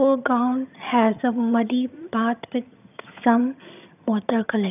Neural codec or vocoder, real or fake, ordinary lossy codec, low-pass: vocoder, 22.05 kHz, 80 mel bands, HiFi-GAN; fake; none; 3.6 kHz